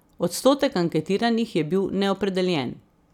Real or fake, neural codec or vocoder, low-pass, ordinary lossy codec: real; none; 19.8 kHz; none